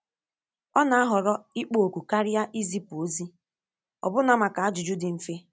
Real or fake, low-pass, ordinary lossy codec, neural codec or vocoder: real; none; none; none